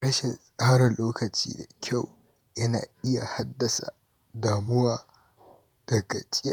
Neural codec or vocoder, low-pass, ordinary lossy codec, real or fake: vocoder, 48 kHz, 128 mel bands, Vocos; none; none; fake